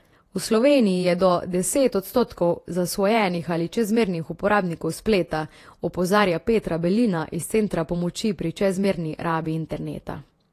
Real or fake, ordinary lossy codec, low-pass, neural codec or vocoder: fake; AAC, 48 kbps; 14.4 kHz; vocoder, 48 kHz, 128 mel bands, Vocos